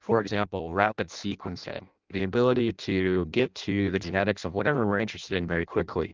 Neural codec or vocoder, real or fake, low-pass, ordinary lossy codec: codec, 16 kHz in and 24 kHz out, 0.6 kbps, FireRedTTS-2 codec; fake; 7.2 kHz; Opus, 32 kbps